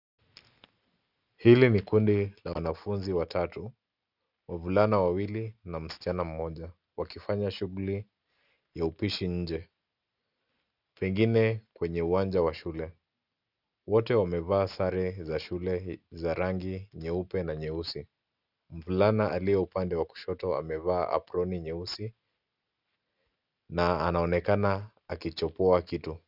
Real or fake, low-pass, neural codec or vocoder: real; 5.4 kHz; none